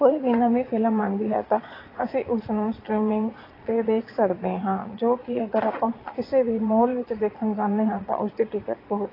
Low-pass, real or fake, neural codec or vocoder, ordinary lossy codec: 5.4 kHz; fake; vocoder, 22.05 kHz, 80 mel bands, Vocos; AAC, 24 kbps